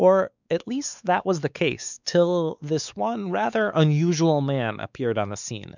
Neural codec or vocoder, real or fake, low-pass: codec, 16 kHz, 4 kbps, X-Codec, WavLM features, trained on Multilingual LibriSpeech; fake; 7.2 kHz